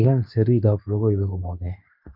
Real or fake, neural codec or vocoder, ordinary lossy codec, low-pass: fake; codec, 24 kHz, 0.9 kbps, WavTokenizer, medium speech release version 2; none; 5.4 kHz